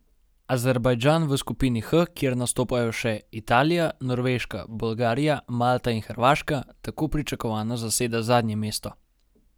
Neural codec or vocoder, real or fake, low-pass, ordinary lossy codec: none; real; none; none